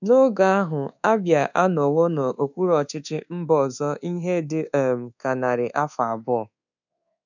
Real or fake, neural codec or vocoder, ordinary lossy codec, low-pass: fake; codec, 24 kHz, 1.2 kbps, DualCodec; none; 7.2 kHz